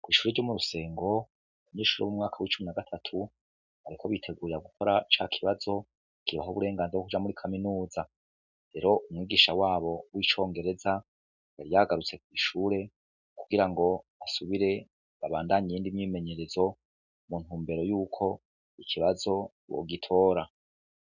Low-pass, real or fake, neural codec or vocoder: 7.2 kHz; real; none